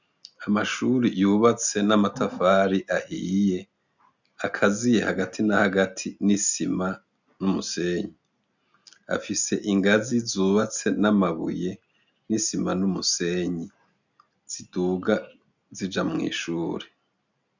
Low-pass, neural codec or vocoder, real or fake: 7.2 kHz; none; real